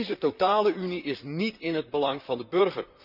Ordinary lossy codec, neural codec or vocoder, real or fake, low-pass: none; vocoder, 44.1 kHz, 128 mel bands, Pupu-Vocoder; fake; 5.4 kHz